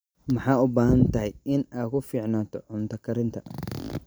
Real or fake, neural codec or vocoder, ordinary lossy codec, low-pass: real; none; none; none